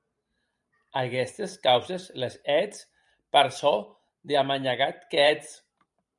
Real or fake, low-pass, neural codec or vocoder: fake; 10.8 kHz; vocoder, 44.1 kHz, 128 mel bands every 512 samples, BigVGAN v2